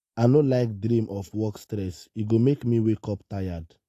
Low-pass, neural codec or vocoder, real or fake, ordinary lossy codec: 14.4 kHz; none; real; AAC, 48 kbps